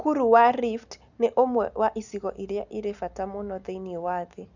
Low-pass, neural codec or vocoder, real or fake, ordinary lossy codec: 7.2 kHz; none; real; none